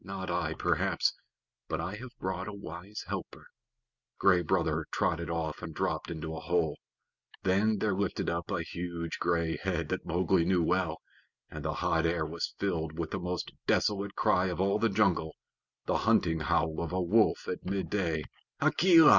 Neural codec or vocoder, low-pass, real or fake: none; 7.2 kHz; real